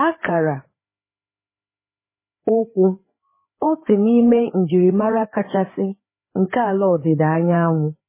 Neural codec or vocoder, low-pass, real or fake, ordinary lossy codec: codec, 16 kHz, 4 kbps, FreqCodec, larger model; 3.6 kHz; fake; MP3, 16 kbps